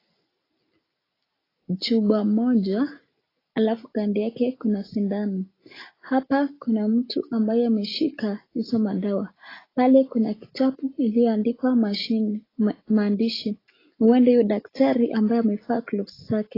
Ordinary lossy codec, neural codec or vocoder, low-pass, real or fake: AAC, 24 kbps; none; 5.4 kHz; real